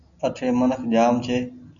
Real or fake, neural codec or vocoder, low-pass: real; none; 7.2 kHz